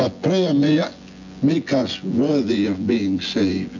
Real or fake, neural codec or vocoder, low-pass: fake; vocoder, 24 kHz, 100 mel bands, Vocos; 7.2 kHz